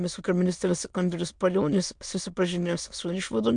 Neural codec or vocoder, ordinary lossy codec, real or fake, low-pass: autoencoder, 22.05 kHz, a latent of 192 numbers a frame, VITS, trained on many speakers; MP3, 64 kbps; fake; 9.9 kHz